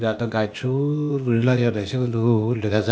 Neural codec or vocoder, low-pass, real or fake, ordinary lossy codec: codec, 16 kHz, 0.8 kbps, ZipCodec; none; fake; none